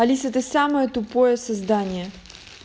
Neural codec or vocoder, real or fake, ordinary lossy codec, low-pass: none; real; none; none